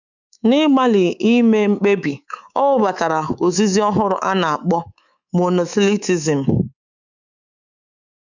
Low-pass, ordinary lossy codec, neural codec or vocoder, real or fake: 7.2 kHz; none; codec, 24 kHz, 3.1 kbps, DualCodec; fake